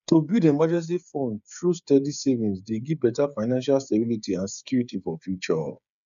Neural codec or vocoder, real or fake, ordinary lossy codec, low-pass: codec, 16 kHz, 8 kbps, FreqCodec, smaller model; fake; none; 7.2 kHz